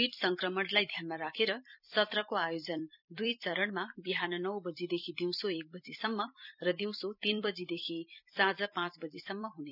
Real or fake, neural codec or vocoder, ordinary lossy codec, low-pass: fake; vocoder, 44.1 kHz, 128 mel bands every 256 samples, BigVGAN v2; none; 5.4 kHz